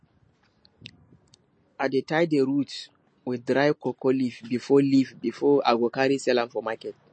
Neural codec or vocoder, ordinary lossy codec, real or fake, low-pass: none; MP3, 32 kbps; real; 9.9 kHz